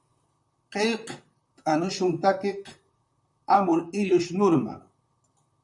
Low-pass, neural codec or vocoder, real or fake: 10.8 kHz; vocoder, 44.1 kHz, 128 mel bands, Pupu-Vocoder; fake